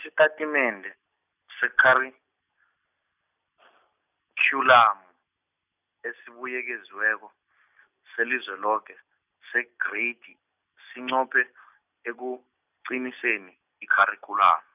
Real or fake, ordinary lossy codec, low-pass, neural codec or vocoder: real; none; 3.6 kHz; none